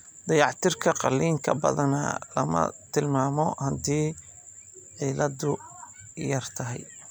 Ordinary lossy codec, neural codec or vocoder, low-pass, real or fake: none; none; none; real